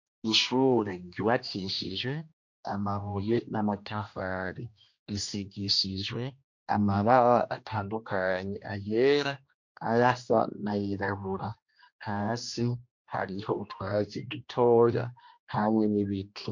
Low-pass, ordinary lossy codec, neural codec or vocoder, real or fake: 7.2 kHz; MP3, 48 kbps; codec, 16 kHz, 1 kbps, X-Codec, HuBERT features, trained on general audio; fake